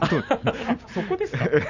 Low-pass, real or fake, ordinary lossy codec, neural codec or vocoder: 7.2 kHz; real; none; none